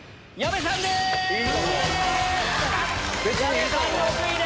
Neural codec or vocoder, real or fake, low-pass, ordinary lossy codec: none; real; none; none